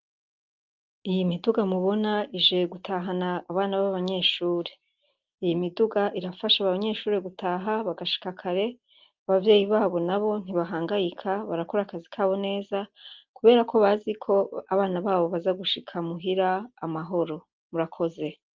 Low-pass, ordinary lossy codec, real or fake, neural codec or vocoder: 7.2 kHz; Opus, 32 kbps; real; none